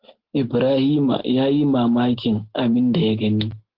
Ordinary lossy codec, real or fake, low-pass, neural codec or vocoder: Opus, 16 kbps; real; 5.4 kHz; none